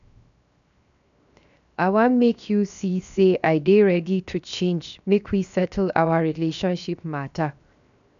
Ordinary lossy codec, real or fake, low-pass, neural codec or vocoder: none; fake; 7.2 kHz; codec, 16 kHz, 0.7 kbps, FocalCodec